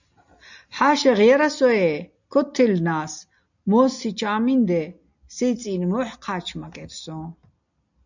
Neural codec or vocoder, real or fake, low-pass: none; real; 7.2 kHz